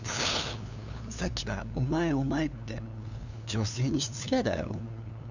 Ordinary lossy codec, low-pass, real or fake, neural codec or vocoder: none; 7.2 kHz; fake; codec, 16 kHz, 2 kbps, FunCodec, trained on LibriTTS, 25 frames a second